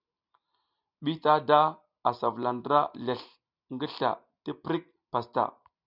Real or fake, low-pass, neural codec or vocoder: real; 5.4 kHz; none